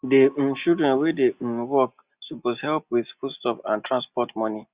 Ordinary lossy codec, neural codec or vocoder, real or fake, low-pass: Opus, 64 kbps; none; real; 3.6 kHz